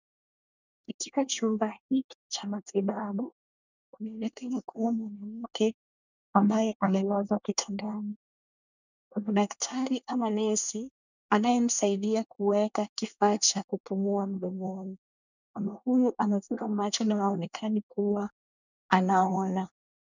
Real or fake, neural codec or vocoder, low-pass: fake; codec, 24 kHz, 1 kbps, SNAC; 7.2 kHz